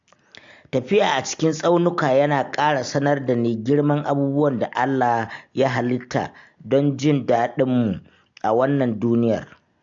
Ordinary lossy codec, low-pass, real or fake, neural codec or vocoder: AAC, 64 kbps; 7.2 kHz; real; none